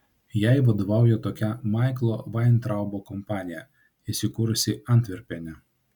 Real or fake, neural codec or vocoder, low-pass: real; none; 19.8 kHz